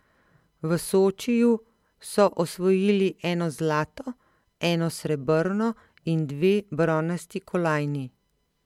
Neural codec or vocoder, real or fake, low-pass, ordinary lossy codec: none; real; 19.8 kHz; MP3, 96 kbps